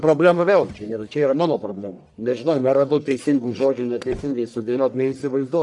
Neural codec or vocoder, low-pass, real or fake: codec, 44.1 kHz, 1.7 kbps, Pupu-Codec; 10.8 kHz; fake